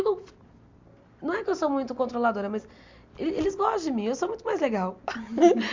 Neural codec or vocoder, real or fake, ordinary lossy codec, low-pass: none; real; MP3, 64 kbps; 7.2 kHz